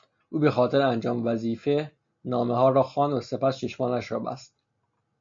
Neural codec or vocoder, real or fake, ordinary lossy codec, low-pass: none; real; MP3, 48 kbps; 7.2 kHz